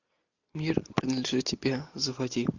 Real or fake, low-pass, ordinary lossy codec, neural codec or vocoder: real; 7.2 kHz; Opus, 64 kbps; none